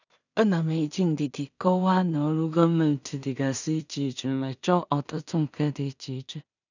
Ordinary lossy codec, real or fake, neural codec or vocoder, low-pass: none; fake; codec, 16 kHz in and 24 kHz out, 0.4 kbps, LongCat-Audio-Codec, two codebook decoder; 7.2 kHz